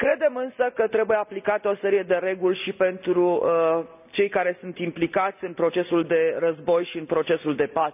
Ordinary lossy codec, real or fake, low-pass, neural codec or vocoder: none; real; 3.6 kHz; none